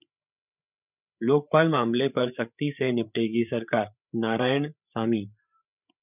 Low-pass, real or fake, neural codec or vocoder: 3.6 kHz; fake; codec, 16 kHz, 8 kbps, FreqCodec, larger model